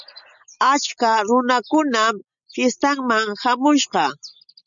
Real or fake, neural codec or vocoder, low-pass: real; none; 7.2 kHz